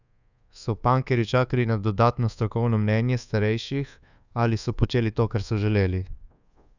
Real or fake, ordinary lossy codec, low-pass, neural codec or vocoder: fake; none; 7.2 kHz; codec, 24 kHz, 1.2 kbps, DualCodec